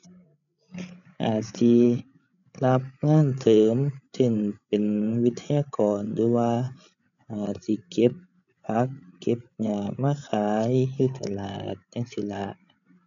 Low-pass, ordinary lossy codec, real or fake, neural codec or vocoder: 7.2 kHz; none; fake; codec, 16 kHz, 8 kbps, FreqCodec, larger model